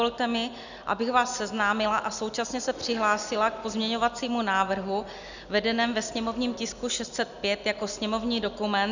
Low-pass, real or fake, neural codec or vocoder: 7.2 kHz; real; none